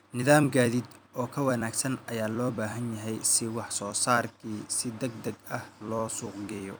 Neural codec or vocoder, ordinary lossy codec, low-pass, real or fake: vocoder, 44.1 kHz, 128 mel bands every 256 samples, BigVGAN v2; none; none; fake